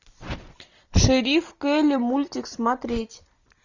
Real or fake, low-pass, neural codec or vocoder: real; 7.2 kHz; none